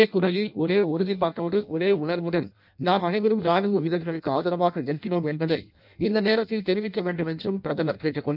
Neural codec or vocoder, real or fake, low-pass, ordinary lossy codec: codec, 16 kHz in and 24 kHz out, 0.6 kbps, FireRedTTS-2 codec; fake; 5.4 kHz; none